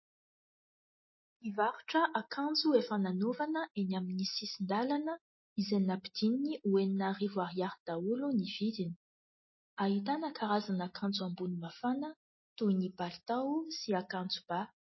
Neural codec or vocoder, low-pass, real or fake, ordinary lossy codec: none; 7.2 kHz; real; MP3, 24 kbps